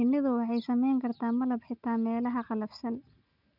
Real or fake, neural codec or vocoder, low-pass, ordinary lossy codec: real; none; 5.4 kHz; none